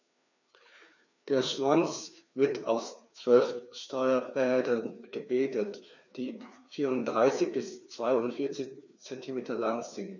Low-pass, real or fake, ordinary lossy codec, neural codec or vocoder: 7.2 kHz; fake; none; codec, 16 kHz, 2 kbps, FreqCodec, larger model